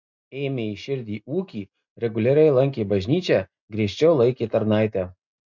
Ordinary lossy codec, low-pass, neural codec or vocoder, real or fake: MP3, 64 kbps; 7.2 kHz; none; real